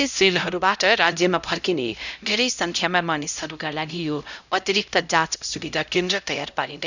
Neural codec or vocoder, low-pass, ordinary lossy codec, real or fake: codec, 16 kHz, 0.5 kbps, X-Codec, HuBERT features, trained on LibriSpeech; 7.2 kHz; none; fake